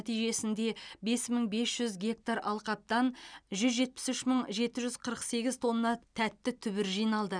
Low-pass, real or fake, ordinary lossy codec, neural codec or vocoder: 9.9 kHz; real; none; none